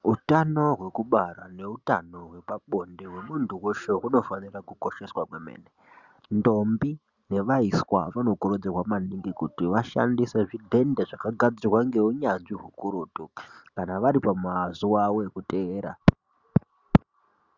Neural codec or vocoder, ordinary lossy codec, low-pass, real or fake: none; Opus, 64 kbps; 7.2 kHz; real